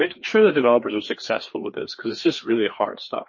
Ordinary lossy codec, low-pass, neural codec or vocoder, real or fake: MP3, 32 kbps; 7.2 kHz; codec, 16 kHz, 2 kbps, FreqCodec, larger model; fake